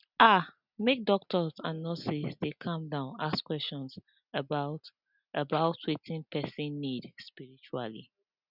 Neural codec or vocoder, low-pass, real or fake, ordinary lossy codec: none; 5.4 kHz; real; none